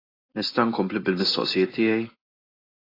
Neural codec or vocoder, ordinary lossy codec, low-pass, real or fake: none; AAC, 24 kbps; 5.4 kHz; real